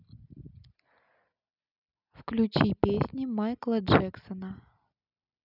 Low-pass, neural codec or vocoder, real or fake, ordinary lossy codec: 5.4 kHz; none; real; none